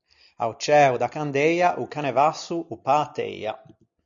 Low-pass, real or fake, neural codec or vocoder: 7.2 kHz; real; none